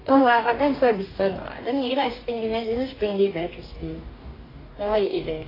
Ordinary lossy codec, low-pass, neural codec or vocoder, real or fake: AAC, 24 kbps; 5.4 kHz; codec, 44.1 kHz, 2.6 kbps, DAC; fake